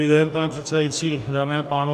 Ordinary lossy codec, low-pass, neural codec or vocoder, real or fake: MP3, 96 kbps; 14.4 kHz; codec, 44.1 kHz, 2.6 kbps, DAC; fake